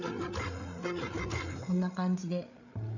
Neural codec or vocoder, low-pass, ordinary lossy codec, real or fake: codec, 16 kHz, 8 kbps, FreqCodec, larger model; 7.2 kHz; none; fake